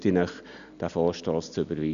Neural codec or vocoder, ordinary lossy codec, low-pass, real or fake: none; none; 7.2 kHz; real